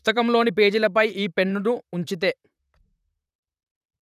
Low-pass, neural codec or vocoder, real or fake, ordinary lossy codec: 14.4 kHz; vocoder, 44.1 kHz, 128 mel bands, Pupu-Vocoder; fake; none